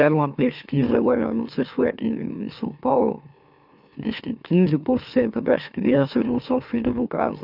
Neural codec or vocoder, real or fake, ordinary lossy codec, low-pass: autoencoder, 44.1 kHz, a latent of 192 numbers a frame, MeloTTS; fake; none; 5.4 kHz